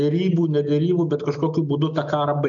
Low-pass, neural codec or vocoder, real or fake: 7.2 kHz; none; real